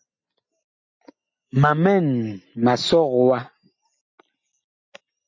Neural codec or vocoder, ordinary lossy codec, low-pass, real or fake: none; MP3, 48 kbps; 7.2 kHz; real